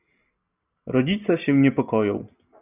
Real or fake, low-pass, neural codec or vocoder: real; 3.6 kHz; none